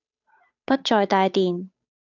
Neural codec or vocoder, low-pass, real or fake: codec, 16 kHz, 8 kbps, FunCodec, trained on Chinese and English, 25 frames a second; 7.2 kHz; fake